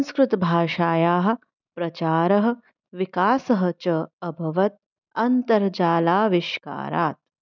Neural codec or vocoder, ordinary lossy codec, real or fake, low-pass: none; none; real; 7.2 kHz